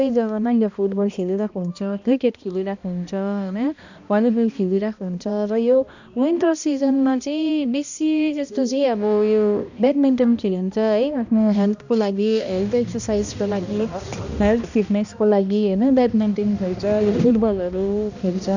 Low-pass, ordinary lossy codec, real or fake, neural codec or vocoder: 7.2 kHz; none; fake; codec, 16 kHz, 1 kbps, X-Codec, HuBERT features, trained on balanced general audio